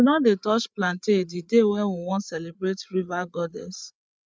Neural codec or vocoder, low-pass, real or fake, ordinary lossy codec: codec, 16 kHz, 8 kbps, FreqCodec, larger model; none; fake; none